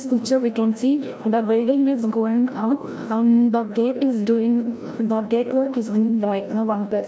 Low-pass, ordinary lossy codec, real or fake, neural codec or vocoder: none; none; fake; codec, 16 kHz, 0.5 kbps, FreqCodec, larger model